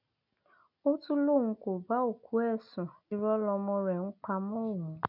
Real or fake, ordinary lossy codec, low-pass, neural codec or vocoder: real; none; 5.4 kHz; none